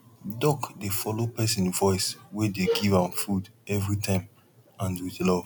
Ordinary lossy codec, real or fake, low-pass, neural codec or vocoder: none; real; none; none